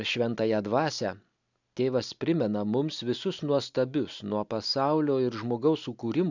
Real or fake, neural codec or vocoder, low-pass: real; none; 7.2 kHz